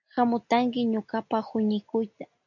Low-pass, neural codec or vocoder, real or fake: 7.2 kHz; none; real